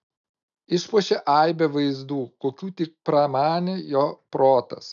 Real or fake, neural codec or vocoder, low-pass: real; none; 7.2 kHz